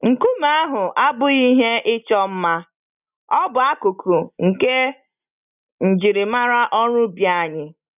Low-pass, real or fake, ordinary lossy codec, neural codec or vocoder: 3.6 kHz; real; none; none